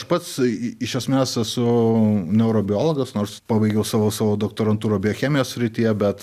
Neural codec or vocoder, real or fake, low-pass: none; real; 14.4 kHz